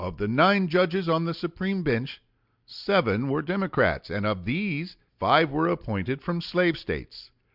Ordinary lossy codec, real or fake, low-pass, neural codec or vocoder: Opus, 64 kbps; real; 5.4 kHz; none